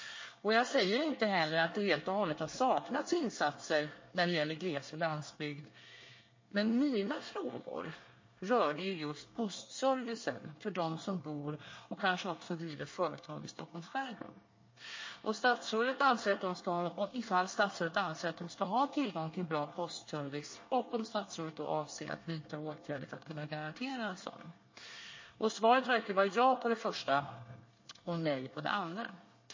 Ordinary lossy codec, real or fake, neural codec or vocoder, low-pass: MP3, 32 kbps; fake; codec, 24 kHz, 1 kbps, SNAC; 7.2 kHz